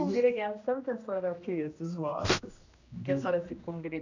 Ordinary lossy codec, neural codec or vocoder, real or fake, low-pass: none; codec, 16 kHz, 1 kbps, X-Codec, HuBERT features, trained on general audio; fake; 7.2 kHz